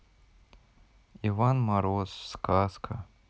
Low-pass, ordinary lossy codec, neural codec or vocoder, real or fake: none; none; none; real